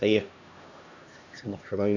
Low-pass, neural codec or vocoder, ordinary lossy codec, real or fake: 7.2 kHz; codec, 16 kHz, 1 kbps, X-Codec, HuBERT features, trained on LibriSpeech; none; fake